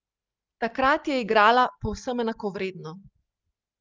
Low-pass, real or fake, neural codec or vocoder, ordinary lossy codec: 7.2 kHz; real; none; Opus, 32 kbps